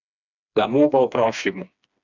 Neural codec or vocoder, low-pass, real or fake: codec, 16 kHz, 2 kbps, FreqCodec, smaller model; 7.2 kHz; fake